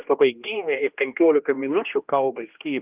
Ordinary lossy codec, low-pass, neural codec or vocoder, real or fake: Opus, 32 kbps; 3.6 kHz; codec, 16 kHz, 1 kbps, X-Codec, HuBERT features, trained on general audio; fake